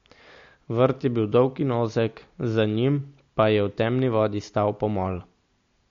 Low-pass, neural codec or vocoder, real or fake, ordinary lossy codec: 7.2 kHz; none; real; MP3, 48 kbps